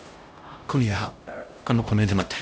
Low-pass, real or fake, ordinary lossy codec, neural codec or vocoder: none; fake; none; codec, 16 kHz, 0.5 kbps, X-Codec, HuBERT features, trained on LibriSpeech